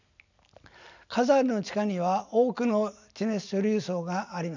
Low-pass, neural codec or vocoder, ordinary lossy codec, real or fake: 7.2 kHz; none; none; real